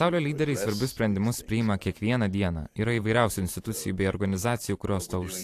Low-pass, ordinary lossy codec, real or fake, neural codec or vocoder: 14.4 kHz; AAC, 64 kbps; fake; vocoder, 44.1 kHz, 128 mel bands every 512 samples, BigVGAN v2